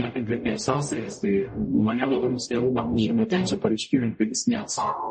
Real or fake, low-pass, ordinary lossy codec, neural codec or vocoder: fake; 10.8 kHz; MP3, 32 kbps; codec, 44.1 kHz, 0.9 kbps, DAC